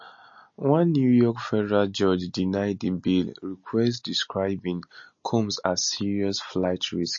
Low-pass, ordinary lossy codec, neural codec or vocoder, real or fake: 7.2 kHz; MP3, 32 kbps; none; real